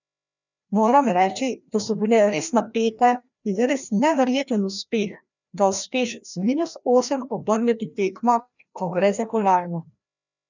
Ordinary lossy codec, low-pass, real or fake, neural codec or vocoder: none; 7.2 kHz; fake; codec, 16 kHz, 1 kbps, FreqCodec, larger model